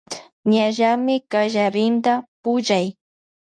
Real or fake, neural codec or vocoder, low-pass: fake; codec, 24 kHz, 0.9 kbps, WavTokenizer, medium speech release version 2; 9.9 kHz